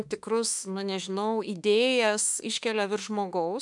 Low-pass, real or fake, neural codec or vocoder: 10.8 kHz; fake; autoencoder, 48 kHz, 32 numbers a frame, DAC-VAE, trained on Japanese speech